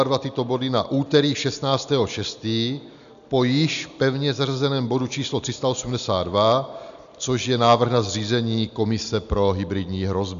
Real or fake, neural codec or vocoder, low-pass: real; none; 7.2 kHz